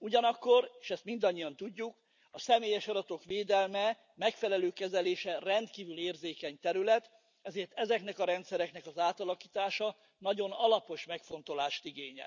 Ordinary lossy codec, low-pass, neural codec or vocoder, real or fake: none; 7.2 kHz; none; real